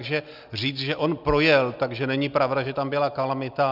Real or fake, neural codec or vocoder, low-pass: real; none; 5.4 kHz